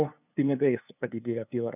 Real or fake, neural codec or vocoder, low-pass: fake; codec, 16 kHz, 2 kbps, FunCodec, trained on LibriTTS, 25 frames a second; 3.6 kHz